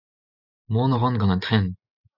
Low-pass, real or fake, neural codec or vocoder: 5.4 kHz; real; none